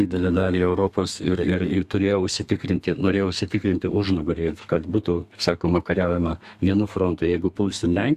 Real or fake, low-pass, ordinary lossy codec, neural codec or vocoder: fake; 14.4 kHz; Opus, 64 kbps; codec, 32 kHz, 1.9 kbps, SNAC